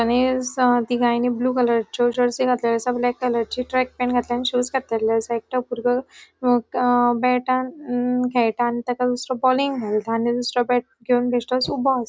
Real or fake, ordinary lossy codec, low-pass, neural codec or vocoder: real; none; none; none